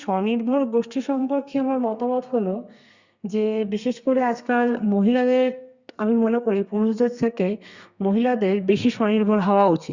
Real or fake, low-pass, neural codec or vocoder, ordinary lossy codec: fake; 7.2 kHz; codec, 32 kHz, 1.9 kbps, SNAC; Opus, 64 kbps